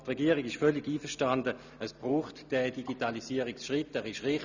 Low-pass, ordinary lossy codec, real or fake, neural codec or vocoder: 7.2 kHz; none; real; none